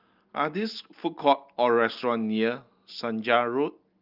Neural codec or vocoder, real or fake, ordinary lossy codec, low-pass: none; real; Opus, 24 kbps; 5.4 kHz